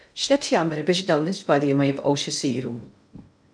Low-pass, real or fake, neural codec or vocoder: 9.9 kHz; fake; codec, 16 kHz in and 24 kHz out, 0.6 kbps, FocalCodec, streaming, 2048 codes